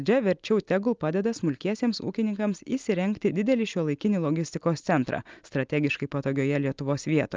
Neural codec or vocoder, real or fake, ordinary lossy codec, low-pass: none; real; Opus, 24 kbps; 7.2 kHz